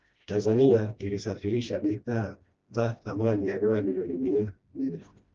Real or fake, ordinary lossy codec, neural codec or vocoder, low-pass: fake; Opus, 24 kbps; codec, 16 kHz, 1 kbps, FreqCodec, smaller model; 7.2 kHz